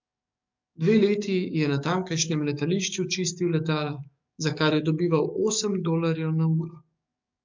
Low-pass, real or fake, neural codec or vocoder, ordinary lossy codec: 7.2 kHz; fake; codec, 44.1 kHz, 7.8 kbps, DAC; MP3, 64 kbps